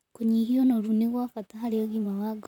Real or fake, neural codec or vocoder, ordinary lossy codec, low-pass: fake; vocoder, 44.1 kHz, 128 mel bands, Pupu-Vocoder; none; 19.8 kHz